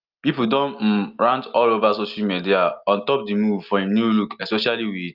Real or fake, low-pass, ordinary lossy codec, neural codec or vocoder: real; 5.4 kHz; Opus, 24 kbps; none